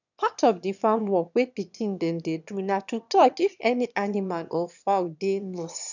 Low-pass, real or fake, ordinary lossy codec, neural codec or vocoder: 7.2 kHz; fake; none; autoencoder, 22.05 kHz, a latent of 192 numbers a frame, VITS, trained on one speaker